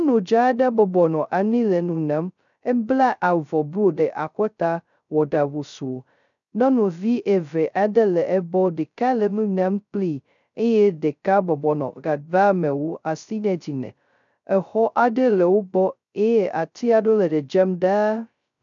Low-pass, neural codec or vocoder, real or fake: 7.2 kHz; codec, 16 kHz, 0.2 kbps, FocalCodec; fake